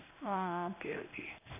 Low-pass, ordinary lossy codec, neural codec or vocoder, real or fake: 3.6 kHz; none; codec, 16 kHz in and 24 kHz out, 1 kbps, XY-Tokenizer; fake